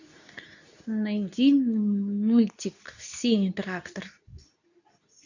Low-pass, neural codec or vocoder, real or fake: 7.2 kHz; codec, 24 kHz, 0.9 kbps, WavTokenizer, medium speech release version 2; fake